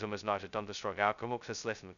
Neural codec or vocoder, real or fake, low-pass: codec, 16 kHz, 0.2 kbps, FocalCodec; fake; 7.2 kHz